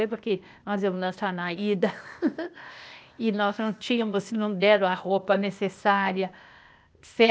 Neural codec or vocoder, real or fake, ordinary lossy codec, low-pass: codec, 16 kHz, 0.8 kbps, ZipCodec; fake; none; none